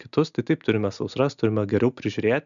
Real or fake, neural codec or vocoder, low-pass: real; none; 7.2 kHz